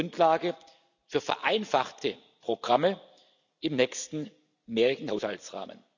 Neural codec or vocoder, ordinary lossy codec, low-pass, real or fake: none; none; 7.2 kHz; real